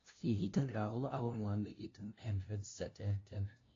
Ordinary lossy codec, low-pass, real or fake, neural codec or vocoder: AAC, 32 kbps; 7.2 kHz; fake; codec, 16 kHz, 0.5 kbps, FunCodec, trained on LibriTTS, 25 frames a second